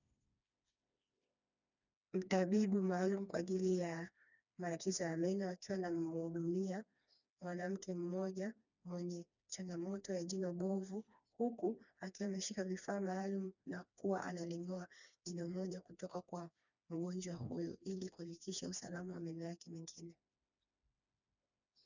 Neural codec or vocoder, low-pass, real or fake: codec, 16 kHz, 2 kbps, FreqCodec, smaller model; 7.2 kHz; fake